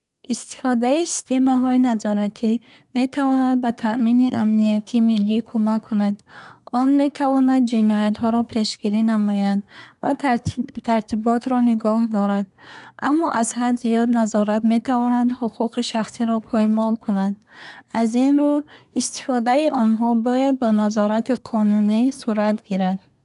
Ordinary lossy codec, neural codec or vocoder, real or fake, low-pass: none; codec, 24 kHz, 1 kbps, SNAC; fake; 10.8 kHz